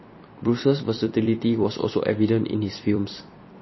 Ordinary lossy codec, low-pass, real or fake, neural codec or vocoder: MP3, 24 kbps; 7.2 kHz; fake; vocoder, 44.1 kHz, 128 mel bands every 256 samples, BigVGAN v2